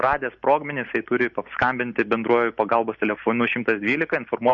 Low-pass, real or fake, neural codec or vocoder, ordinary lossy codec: 7.2 kHz; real; none; MP3, 48 kbps